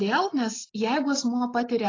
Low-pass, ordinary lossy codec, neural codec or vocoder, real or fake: 7.2 kHz; AAC, 32 kbps; none; real